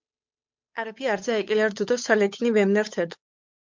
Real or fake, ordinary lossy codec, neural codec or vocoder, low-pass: fake; MP3, 64 kbps; codec, 16 kHz, 8 kbps, FunCodec, trained on Chinese and English, 25 frames a second; 7.2 kHz